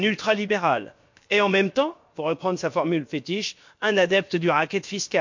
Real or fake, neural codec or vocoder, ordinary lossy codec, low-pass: fake; codec, 16 kHz, about 1 kbps, DyCAST, with the encoder's durations; MP3, 48 kbps; 7.2 kHz